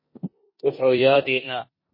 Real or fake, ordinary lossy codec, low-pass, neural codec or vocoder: fake; MP3, 24 kbps; 5.4 kHz; codec, 16 kHz in and 24 kHz out, 0.9 kbps, LongCat-Audio-Codec, four codebook decoder